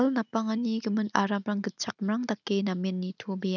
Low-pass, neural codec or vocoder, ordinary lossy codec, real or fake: 7.2 kHz; none; none; real